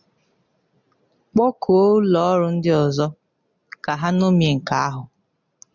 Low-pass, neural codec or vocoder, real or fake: 7.2 kHz; none; real